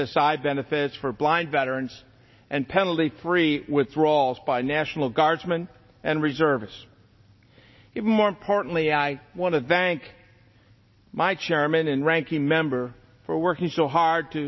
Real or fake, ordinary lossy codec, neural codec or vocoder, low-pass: real; MP3, 24 kbps; none; 7.2 kHz